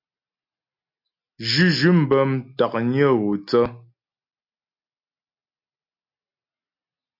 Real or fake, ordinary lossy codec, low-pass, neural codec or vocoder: real; MP3, 48 kbps; 5.4 kHz; none